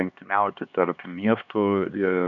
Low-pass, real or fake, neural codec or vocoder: 7.2 kHz; fake; codec, 16 kHz, 2 kbps, X-Codec, HuBERT features, trained on LibriSpeech